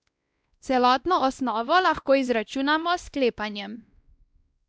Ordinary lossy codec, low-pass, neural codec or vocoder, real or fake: none; none; codec, 16 kHz, 1 kbps, X-Codec, WavLM features, trained on Multilingual LibriSpeech; fake